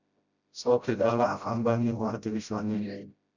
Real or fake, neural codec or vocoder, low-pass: fake; codec, 16 kHz, 0.5 kbps, FreqCodec, smaller model; 7.2 kHz